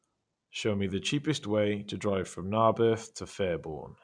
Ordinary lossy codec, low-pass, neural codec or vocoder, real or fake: none; 9.9 kHz; none; real